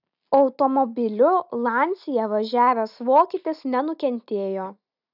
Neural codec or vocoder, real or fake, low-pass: none; real; 5.4 kHz